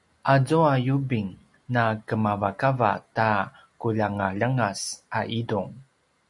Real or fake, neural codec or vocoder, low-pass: real; none; 10.8 kHz